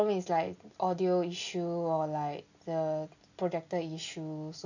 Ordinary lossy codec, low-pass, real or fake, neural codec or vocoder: none; 7.2 kHz; real; none